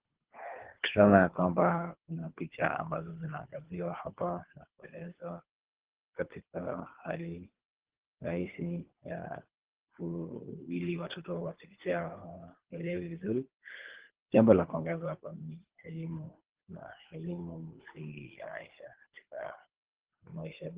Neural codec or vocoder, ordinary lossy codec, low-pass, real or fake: codec, 24 kHz, 3 kbps, HILCodec; Opus, 16 kbps; 3.6 kHz; fake